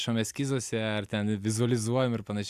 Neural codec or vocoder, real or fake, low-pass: none; real; 14.4 kHz